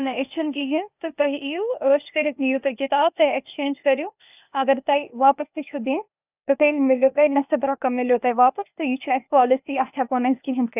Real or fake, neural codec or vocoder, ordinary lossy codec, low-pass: fake; codec, 16 kHz, 0.8 kbps, ZipCodec; none; 3.6 kHz